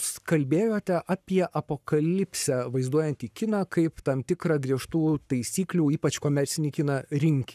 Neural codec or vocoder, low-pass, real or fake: codec, 44.1 kHz, 7.8 kbps, Pupu-Codec; 14.4 kHz; fake